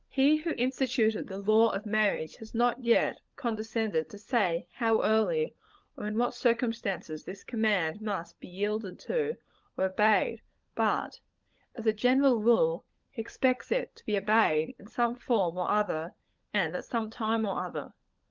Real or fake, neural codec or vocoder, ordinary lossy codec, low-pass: fake; codec, 16 kHz, 16 kbps, FunCodec, trained on LibriTTS, 50 frames a second; Opus, 32 kbps; 7.2 kHz